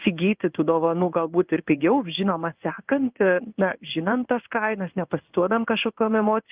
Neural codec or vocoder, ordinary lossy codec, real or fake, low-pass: codec, 16 kHz in and 24 kHz out, 1 kbps, XY-Tokenizer; Opus, 24 kbps; fake; 3.6 kHz